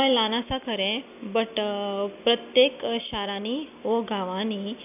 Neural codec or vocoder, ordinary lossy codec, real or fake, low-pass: none; none; real; 3.6 kHz